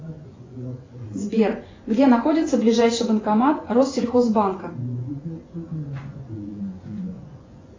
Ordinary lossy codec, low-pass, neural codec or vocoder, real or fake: AAC, 32 kbps; 7.2 kHz; vocoder, 24 kHz, 100 mel bands, Vocos; fake